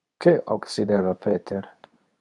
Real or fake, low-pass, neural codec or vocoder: fake; 10.8 kHz; codec, 24 kHz, 0.9 kbps, WavTokenizer, medium speech release version 2